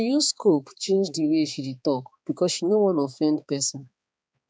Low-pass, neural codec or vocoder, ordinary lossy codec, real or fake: none; codec, 16 kHz, 4 kbps, X-Codec, HuBERT features, trained on balanced general audio; none; fake